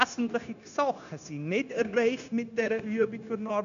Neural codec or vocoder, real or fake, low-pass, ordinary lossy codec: codec, 16 kHz, 0.9 kbps, LongCat-Audio-Codec; fake; 7.2 kHz; none